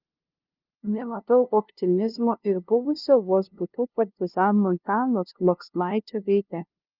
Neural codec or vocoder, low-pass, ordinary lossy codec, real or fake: codec, 16 kHz, 0.5 kbps, FunCodec, trained on LibriTTS, 25 frames a second; 5.4 kHz; Opus, 24 kbps; fake